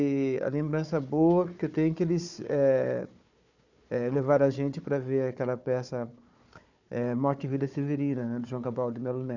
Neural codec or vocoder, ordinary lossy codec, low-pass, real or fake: codec, 16 kHz, 4 kbps, FunCodec, trained on Chinese and English, 50 frames a second; none; 7.2 kHz; fake